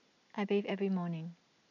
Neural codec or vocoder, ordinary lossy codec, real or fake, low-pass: none; none; real; 7.2 kHz